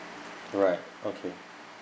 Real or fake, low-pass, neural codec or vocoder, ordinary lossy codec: real; none; none; none